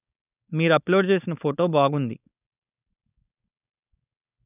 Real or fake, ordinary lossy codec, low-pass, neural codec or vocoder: fake; none; 3.6 kHz; codec, 16 kHz, 4.8 kbps, FACodec